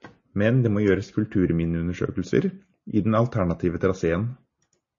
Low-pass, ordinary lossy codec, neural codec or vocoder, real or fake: 7.2 kHz; MP3, 32 kbps; none; real